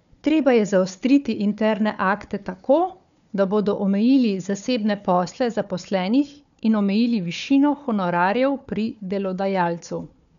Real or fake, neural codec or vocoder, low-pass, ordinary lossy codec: fake; codec, 16 kHz, 4 kbps, FunCodec, trained on Chinese and English, 50 frames a second; 7.2 kHz; none